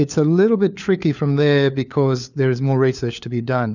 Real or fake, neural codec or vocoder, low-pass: fake; codec, 16 kHz, 4 kbps, FunCodec, trained on LibriTTS, 50 frames a second; 7.2 kHz